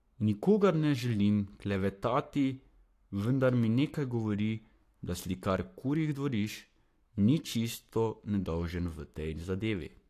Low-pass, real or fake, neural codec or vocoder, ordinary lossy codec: 14.4 kHz; fake; codec, 44.1 kHz, 7.8 kbps, Pupu-Codec; AAC, 64 kbps